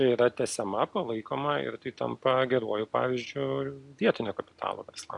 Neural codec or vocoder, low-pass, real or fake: none; 10.8 kHz; real